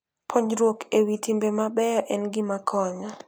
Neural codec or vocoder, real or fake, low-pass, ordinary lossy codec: none; real; none; none